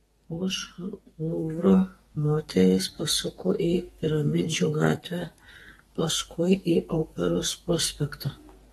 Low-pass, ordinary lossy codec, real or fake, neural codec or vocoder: 14.4 kHz; AAC, 32 kbps; fake; codec, 32 kHz, 1.9 kbps, SNAC